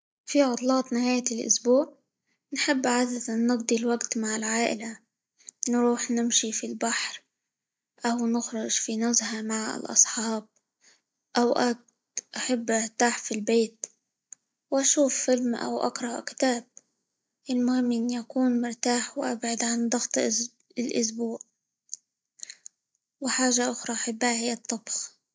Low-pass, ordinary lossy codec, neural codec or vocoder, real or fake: none; none; none; real